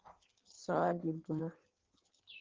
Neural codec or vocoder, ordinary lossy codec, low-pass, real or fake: codec, 16 kHz in and 24 kHz out, 1.1 kbps, FireRedTTS-2 codec; Opus, 16 kbps; 7.2 kHz; fake